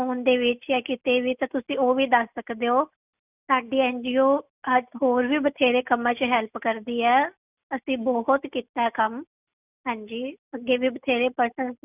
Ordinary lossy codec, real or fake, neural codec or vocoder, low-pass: none; real; none; 3.6 kHz